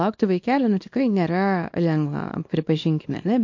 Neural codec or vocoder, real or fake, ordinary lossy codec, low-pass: codec, 24 kHz, 0.9 kbps, WavTokenizer, small release; fake; MP3, 48 kbps; 7.2 kHz